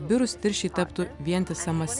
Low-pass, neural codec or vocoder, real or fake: 10.8 kHz; none; real